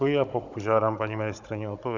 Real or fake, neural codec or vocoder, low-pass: fake; codec, 16 kHz, 16 kbps, FunCodec, trained on Chinese and English, 50 frames a second; 7.2 kHz